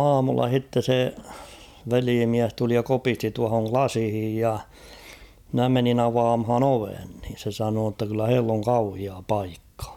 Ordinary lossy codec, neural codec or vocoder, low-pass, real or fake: none; none; 19.8 kHz; real